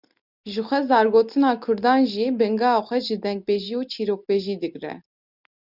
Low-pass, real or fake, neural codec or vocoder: 5.4 kHz; real; none